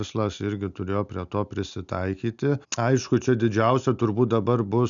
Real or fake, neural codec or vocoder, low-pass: real; none; 7.2 kHz